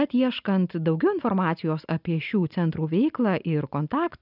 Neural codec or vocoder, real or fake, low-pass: none; real; 5.4 kHz